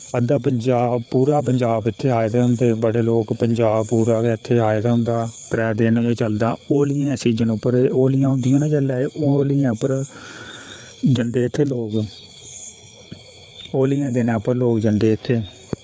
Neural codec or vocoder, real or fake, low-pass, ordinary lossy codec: codec, 16 kHz, 4 kbps, FreqCodec, larger model; fake; none; none